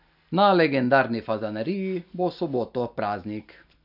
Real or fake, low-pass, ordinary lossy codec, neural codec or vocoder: real; 5.4 kHz; none; none